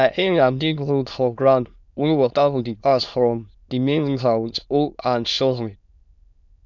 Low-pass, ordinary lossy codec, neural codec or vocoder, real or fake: 7.2 kHz; none; autoencoder, 22.05 kHz, a latent of 192 numbers a frame, VITS, trained on many speakers; fake